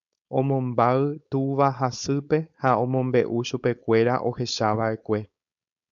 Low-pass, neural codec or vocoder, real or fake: 7.2 kHz; codec, 16 kHz, 4.8 kbps, FACodec; fake